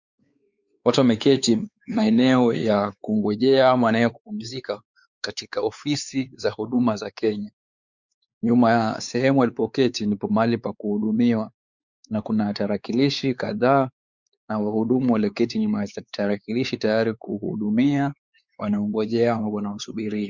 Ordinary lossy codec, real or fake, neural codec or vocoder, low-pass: Opus, 64 kbps; fake; codec, 16 kHz, 4 kbps, X-Codec, WavLM features, trained on Multilingual LibriSpeech; 7.2 kHz